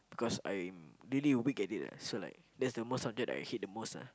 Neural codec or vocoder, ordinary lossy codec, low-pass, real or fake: none; none; none; real